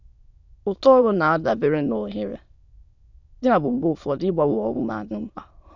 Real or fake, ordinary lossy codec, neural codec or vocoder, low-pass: fake; none; autoencoder, 22.05 kHz, a latent of 192 numbers a frame, VITS, trained on many speakers; 7.2 kHz